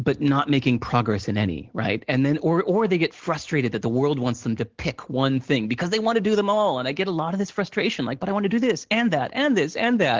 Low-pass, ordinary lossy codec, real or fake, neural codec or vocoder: 7.2 kHz; Opus, 16 kbps; real; none